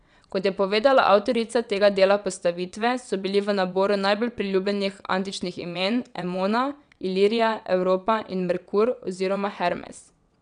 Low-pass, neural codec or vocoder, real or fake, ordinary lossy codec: 9.9 kHz; vocoder, 22.05 kHz, 80 mel bands, WaveNeXt; fake; none